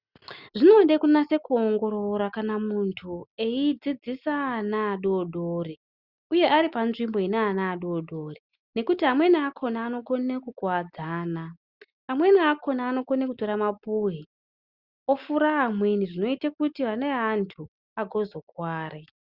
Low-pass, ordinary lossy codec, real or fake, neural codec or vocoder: 5.4 kHz; Opus, 64 kbps; real; none